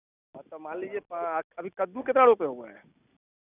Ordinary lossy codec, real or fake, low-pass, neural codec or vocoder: none; real; 3.6 kHz; none